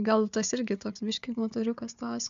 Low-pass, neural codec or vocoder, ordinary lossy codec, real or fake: 7.2 kHz; codec, 16 kHz, 4 kbps, FunCodec, trained on Chinese and English, 50 frames a second; MP3, 64 kbps; fake